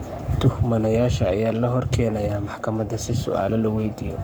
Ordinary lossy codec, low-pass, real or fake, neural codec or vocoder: none; none; fake; codec, 44.1 kHz, 7.8 kbps, Pupu-Codec